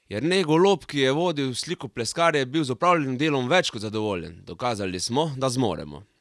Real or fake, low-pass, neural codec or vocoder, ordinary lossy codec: real; none; none; none